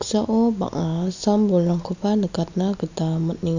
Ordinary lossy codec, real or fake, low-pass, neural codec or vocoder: none; real; 7.2 kHz; none